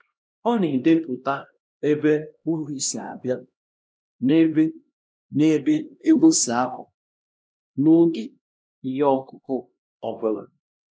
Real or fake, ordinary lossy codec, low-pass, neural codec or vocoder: fake; none; none; codec, 16 kHz, 1 kbps, X-Codec, HuBERT features, trained on LibriSpeech